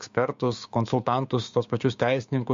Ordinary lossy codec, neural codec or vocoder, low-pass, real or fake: MP3, 48 kbps; codec, 16 kHz, 6 kbps, DAC; 7.2 kHz; fake